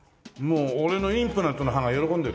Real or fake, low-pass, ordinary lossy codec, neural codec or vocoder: real; none; none; none